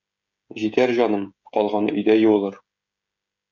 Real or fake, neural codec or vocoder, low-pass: fake; codec, 16 kHz, 16 kbps, FreqCodec, smaller model; 7.2 kHz